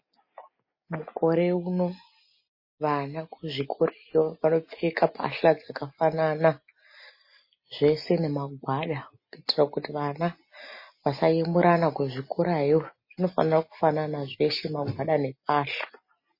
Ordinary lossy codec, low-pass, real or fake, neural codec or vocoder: MP3, 24 kbps; 5.4 kHz; real; none